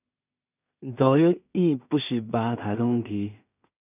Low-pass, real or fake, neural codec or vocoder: 3.6 kHz; fake; codec, 16 kHz in and 24 kHz out, 0.4 kbps, LongCat-Audio-Codec, two codebook decoder